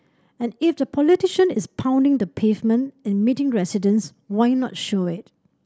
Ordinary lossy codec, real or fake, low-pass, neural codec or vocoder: none; real; none; none